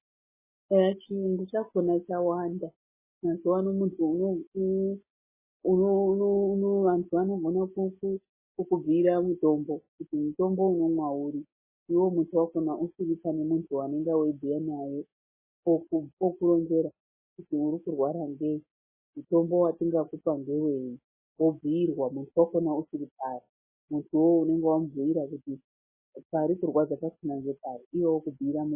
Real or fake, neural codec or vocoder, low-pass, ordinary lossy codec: real; none; 3.6 kHz; MP3, 16 kbps